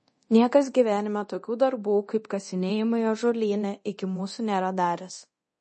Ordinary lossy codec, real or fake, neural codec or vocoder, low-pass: MP3, 32 kbps; fake; codec, 24 kHz, 0.9 kbps, DualCodec; 10.8 kHz